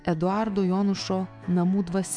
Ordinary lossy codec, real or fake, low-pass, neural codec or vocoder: MP3, 96 kbps; fake; 9.9 kHz; autoencoder, 48 kHz, 128 numbers a frame, DAC-VAE, trained on Japanese speech